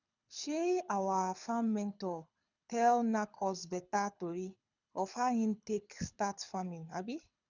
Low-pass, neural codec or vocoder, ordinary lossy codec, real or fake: 7.2 kHz; codec, 24 kHz, 6 kbps, HILCodec; none; fake